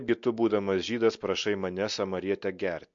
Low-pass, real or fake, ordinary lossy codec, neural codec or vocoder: 7.2 kHz; real; MP3, 48 kbps; none